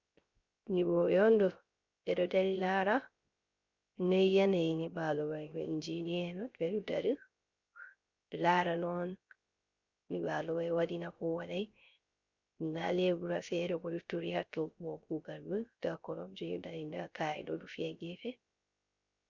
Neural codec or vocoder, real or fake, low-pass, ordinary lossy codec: codec, 16 kHz, 0.3 kbps, FocalCodec; fake; 7.2 kHz; Opus, 64 kbps